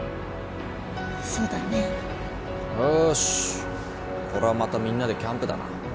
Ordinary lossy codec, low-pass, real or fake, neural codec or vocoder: none; none; real; none